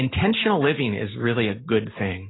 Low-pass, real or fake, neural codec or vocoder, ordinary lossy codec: 7.2 kHz; real; none; AAC, 16 kbps